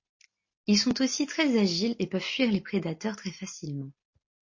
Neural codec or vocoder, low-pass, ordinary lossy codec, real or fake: none; 7.2 kHz; MP3, 32 kbps; real